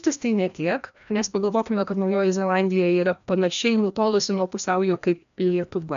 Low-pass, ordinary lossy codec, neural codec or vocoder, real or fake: 7.2 kHz; AAC, 96 kbps; codec, 16 kHz, 1 kbps, FreqCodec, larger model; fake